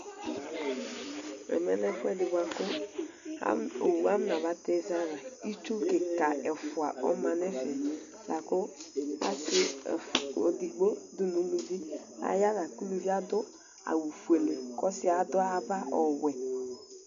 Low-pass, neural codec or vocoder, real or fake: 7.2 kHz; none; real